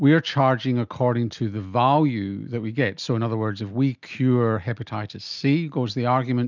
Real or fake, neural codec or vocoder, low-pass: real; none; 7.2 kHz